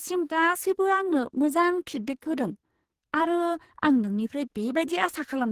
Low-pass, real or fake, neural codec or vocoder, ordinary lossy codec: 14.4 kHz; fake; codec, 44.1 kHz, 2.6 kbps, SNAC; Opus, 16 kbps